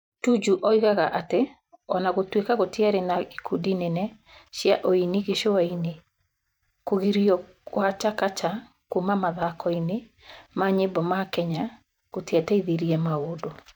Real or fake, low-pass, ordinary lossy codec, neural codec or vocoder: fake; 19.8 kHz; none; vocoder, 44.1 kHz, 128 mel bands every 512 samples, BigVGAN v2